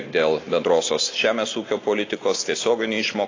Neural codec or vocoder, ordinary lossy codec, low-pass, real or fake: none; AAC, 32 kbps; 7.2 kHz; real